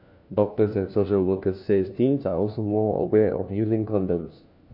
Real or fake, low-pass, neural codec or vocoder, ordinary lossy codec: fake; 5.4 kHz; codec, 16 kHz, 1 kbps, FunCodec, trained on LibriTTS, 50 frames a second; none